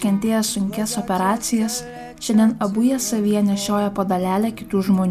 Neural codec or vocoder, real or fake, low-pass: none; real; 14.4 kHz